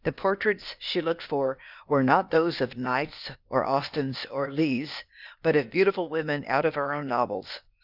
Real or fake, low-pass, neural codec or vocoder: fake; 5.4 kHz; codec, 16 kHz, 0.8 kbps, ZipCodec